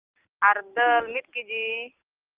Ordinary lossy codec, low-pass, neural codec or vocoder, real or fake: Opus, 24 kbps; 3.6 kHz; none; real